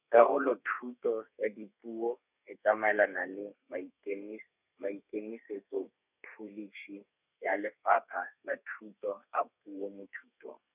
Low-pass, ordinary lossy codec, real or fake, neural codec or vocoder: 3.6 kHz; none; fake; codec, 32 kHz, 1.9 kbps, SNAC